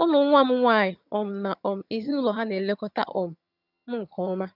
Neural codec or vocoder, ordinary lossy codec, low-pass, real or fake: vocoder, 22.05 kHz, 80 mel bands, HiFi-GAN; none; 5.4 kHz; fake